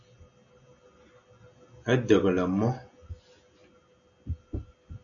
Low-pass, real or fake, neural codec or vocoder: 7.2 kHz; real; none